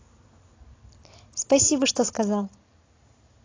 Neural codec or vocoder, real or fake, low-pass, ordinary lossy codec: none; real; 7.2 kHz; AAC, 32 kbps